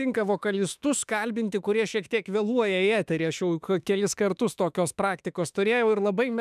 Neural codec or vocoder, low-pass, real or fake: autoencoder, 48 kHz, 32 numbers a frame, DAC-VAE, trained on Japanese speech; 14.4 kHz; fake